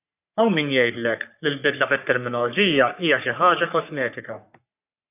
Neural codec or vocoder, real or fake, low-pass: codec, 44.1 kHz, 3.4 kbps, Pupu-Codec; fake; 3.6 kHz